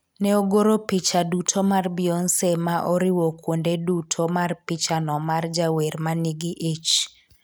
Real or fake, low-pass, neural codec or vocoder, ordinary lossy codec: real; none; none; none